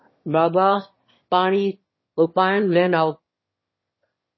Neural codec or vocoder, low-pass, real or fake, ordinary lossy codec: autoencoder, 22.05 kHz, a latent of 192 numbers a frame, VITS, trained on one speaker; 7.2 kHz; fake; MP3, 24 kbps